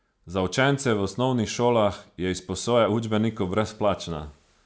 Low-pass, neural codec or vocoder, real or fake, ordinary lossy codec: none; none; real; none